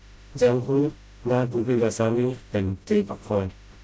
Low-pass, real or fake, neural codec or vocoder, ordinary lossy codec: none; fake; codec, 16 kHz, 0.5 kbps, FreqCodec, smaller model; none